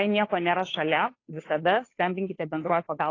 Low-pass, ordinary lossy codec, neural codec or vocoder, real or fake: 7.2 kHz; AAC, 32 kbps; codec, 16 kHz, 2 kbps, FunCodec, trained on Chinese and English, 25 frames a second; fake